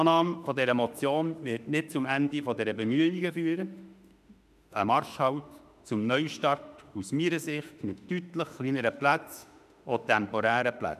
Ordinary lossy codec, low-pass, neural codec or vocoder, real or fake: none; 14.4 kHz; autoencoder, 48 kHz, 32 numbers a frame, DAC-VAE, trained on Japanese speech; fake